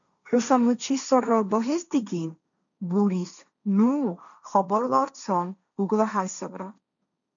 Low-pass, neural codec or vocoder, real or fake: 7.2 kHz; codec, 16 kHz, 1.1 kbps, Voila-Tokenizer; fake